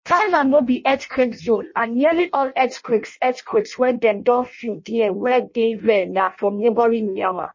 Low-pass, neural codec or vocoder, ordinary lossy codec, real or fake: 7.2 kHz; codec, 16 kHz in and 24 kHz out, 0.6 kbps, FireRedTTS-2 codec; MP3, 32 kbps; fake